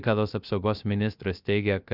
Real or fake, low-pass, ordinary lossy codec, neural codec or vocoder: fake; 5.4 kHz; Opus, 64 kbps; codec, 16 kHz, 0.3 kbps, FocalCodec